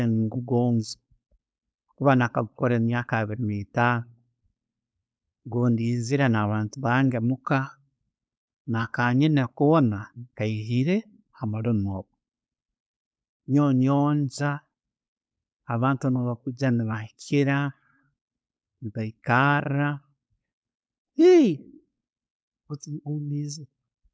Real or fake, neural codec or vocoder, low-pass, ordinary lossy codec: fake; codec, 16 kHz, 4.8 kbps, FACodec; none; none